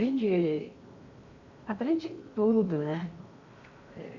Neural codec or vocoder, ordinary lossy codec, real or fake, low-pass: codec, 16 kHz in and 24 kHz out, 0.8 kbps, FocalCodec, streaming, 65536 codes; Opus, 64 kbps; fake; 7.2 kHz